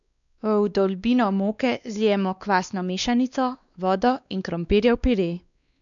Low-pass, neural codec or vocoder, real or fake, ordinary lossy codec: 7.2 kHz; codec, 16 kHz, 2 kbps, X-Codec, WavLM features, trained on Multilingual LibriSpeech; fake; none